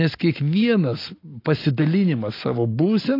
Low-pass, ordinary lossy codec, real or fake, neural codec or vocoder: 5.4 kHz; AAC, 32 kbps; real; none